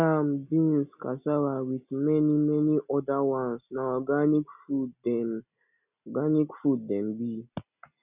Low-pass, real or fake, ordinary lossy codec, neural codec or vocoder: 3.6 kHz; real; none; none